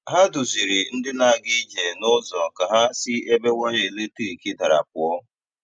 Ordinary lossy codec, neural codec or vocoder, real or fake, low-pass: none; none; real; 9.9 kHz